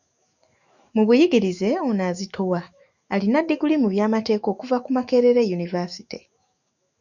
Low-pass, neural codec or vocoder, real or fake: 7.2 kHz; autoencoder, 48 kHz, 128 numbers a frame, DAC-VAE, trained on Japanese speech; fake